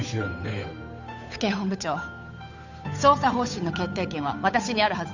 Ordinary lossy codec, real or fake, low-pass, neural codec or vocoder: none; fake; 7.2 kHz; codec, 16 kHz, 8 kbps, FunCodec, trained on Chinese and English, 25 frames a second